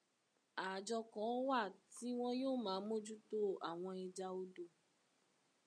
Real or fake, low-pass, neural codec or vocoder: real; 9.9 kHz; none